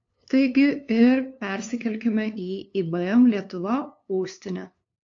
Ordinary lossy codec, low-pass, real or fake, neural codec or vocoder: AAC, 48 kbps; 7.2 kHz; fake; codec, 16 kHz, 2 kbps, FunCodec, trained on LibriTTS, 25 frames a second